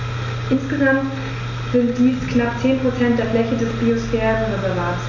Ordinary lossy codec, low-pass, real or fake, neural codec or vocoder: none; 7.2 kHz; real; none